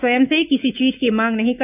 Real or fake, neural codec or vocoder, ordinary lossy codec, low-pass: fake; autoencoder, 48 kHz, 128 numbers a frame, DAC-VAE, trained on Japanese speech; none; 3.6 kHz